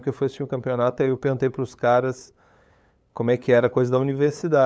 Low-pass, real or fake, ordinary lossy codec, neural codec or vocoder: none; fake; none; codec, 16 kHz, 8 kbps, FunCodec, trained on LibriTTS, 25 frames a second